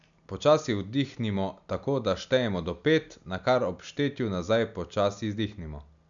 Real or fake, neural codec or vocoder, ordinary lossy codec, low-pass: real; none; none; 7.2 kHz